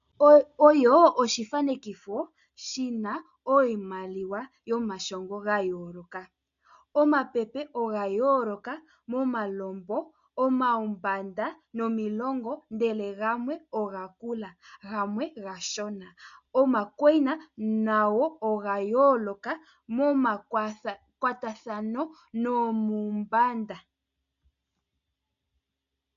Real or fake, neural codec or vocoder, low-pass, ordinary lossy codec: real; none; 7.2 kHz; AAC, 96 kbps